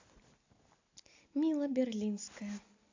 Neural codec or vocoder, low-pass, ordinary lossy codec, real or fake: none; 7.2 kHz; AAC, 48 kbps; real